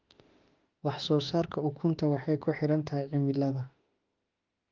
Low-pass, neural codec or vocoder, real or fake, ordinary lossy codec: 7.2 kHz; autoencoder, 48 kHz, 32 numbers a frame, DAC-VAE, trained on Japanese speech; fake; Opus, 32 kbps